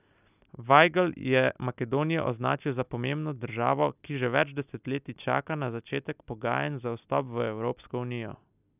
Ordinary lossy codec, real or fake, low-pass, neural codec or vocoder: none; real; 3.6 kHz; none